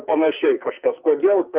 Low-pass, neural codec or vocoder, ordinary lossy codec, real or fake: 3.6 kHz; codec, 44.1 kHz, 2.6 kbps, SNAC; Opus, 32 kbps; fake